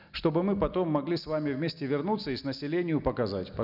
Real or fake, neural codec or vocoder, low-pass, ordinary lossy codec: real; none; 5.4 kHz; none